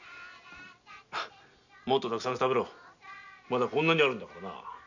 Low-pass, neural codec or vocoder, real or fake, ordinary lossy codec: 7.2 kHz; none; real; none